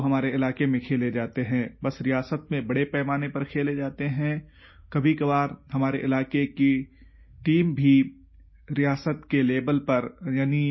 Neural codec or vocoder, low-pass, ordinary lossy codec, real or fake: none; 7.2 kHz; MP3, 24 kbps; real